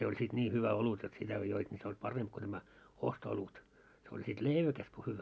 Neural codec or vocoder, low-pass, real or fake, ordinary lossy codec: none; none; real; none